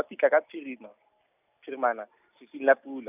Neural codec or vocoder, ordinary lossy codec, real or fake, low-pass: none; none; real; 3.6 kHz